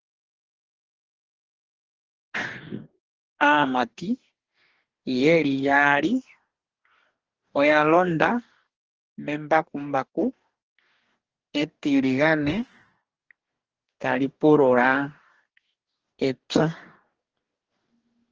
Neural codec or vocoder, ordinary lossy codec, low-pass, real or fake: codec, 44.1 kHz, 2.6 kbps, DAC; Opus, 16 kbps; 7.2 kHz; fake